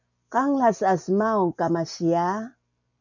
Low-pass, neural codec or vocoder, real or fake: 7.2 kHz; none; real